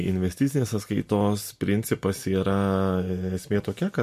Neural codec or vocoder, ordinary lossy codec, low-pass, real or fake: none; MP3, 64 kbps; 14.4 kHz; real